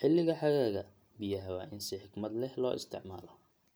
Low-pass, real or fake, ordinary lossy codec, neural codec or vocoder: none; real; none; none